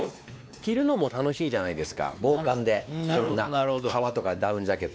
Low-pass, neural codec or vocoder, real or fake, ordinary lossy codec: none; codec, 16 kHz, 2 kbps, X-Codec, WavLM features, trained on Multilingual LibriSpeech; fake; none